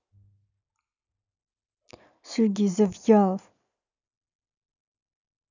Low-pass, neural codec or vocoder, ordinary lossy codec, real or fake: 7.2 kHz; none; none; real